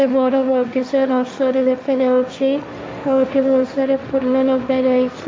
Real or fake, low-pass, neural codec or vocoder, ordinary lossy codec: fake; none; codec, 16 kHz, 1.1 kbps, Voila-Tokenizer; none